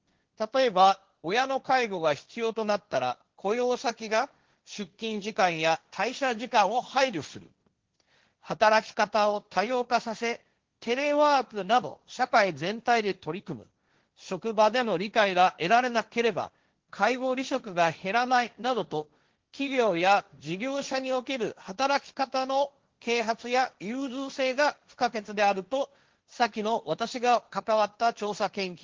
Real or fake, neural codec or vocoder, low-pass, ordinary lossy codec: fake; codec, 16 kHz, 1.1 kbps, Voila-Tokenizer; 7.2 kHz; Opus, 16 kbps